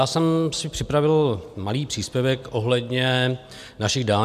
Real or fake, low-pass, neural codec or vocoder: real; 14.4 kHz; none